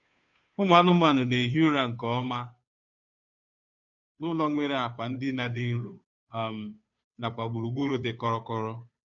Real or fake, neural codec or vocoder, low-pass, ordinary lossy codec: fake; codec, 16 kHz, 2 kbps, FunCodec, trained on Chinese and English, 25 frames a second; 7.2 kHz; none